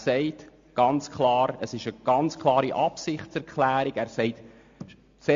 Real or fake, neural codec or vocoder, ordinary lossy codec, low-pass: real; none; MP3, 48 kbps; 7.2 kHz